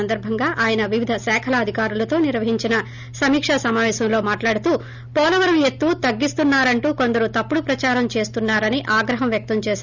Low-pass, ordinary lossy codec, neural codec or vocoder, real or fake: 7.2 kHz; none; none; real